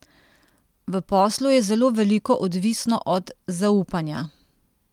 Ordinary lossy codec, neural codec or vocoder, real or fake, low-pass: Opus, 32 kbps; none; real; 19.8 kHz